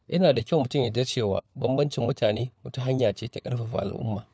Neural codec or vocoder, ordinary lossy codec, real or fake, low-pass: codec, 16 kHz, 4 kbps, FunCodec, trained on LibriTTS, 50 frames a second; none; fake; none